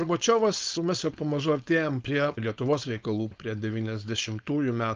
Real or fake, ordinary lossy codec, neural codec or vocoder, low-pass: fake; Opus, 24 kbps; codec, 16 kHz, 4.8 kbps, FACodec; 7.2 kHz